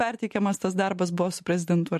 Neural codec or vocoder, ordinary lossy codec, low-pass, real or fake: none; MP3, 64 kbps; 14.4 kHz; real